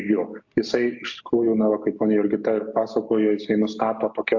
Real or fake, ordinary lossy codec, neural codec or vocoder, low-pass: real; AAC, 48 kbps; none; 7.2 kHz